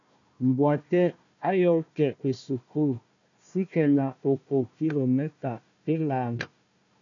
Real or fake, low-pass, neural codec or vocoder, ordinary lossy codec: fake; 7.2 kHz; codec, 16 kHz, 1 kbps, FunCodec, trained on Chinese and English, 50 frames a second; MP3, 96 kbps